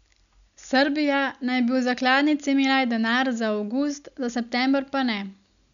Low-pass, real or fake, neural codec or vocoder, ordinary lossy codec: 7.2 kHz; real; none; none